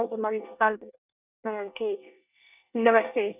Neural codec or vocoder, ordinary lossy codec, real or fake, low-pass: codec, 24 kHz, 1 kbps, SNAC; none; fake; 3.6 kHz